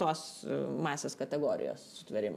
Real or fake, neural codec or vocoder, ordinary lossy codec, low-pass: real; none; MP3, 96 kbps; 14.4 kHz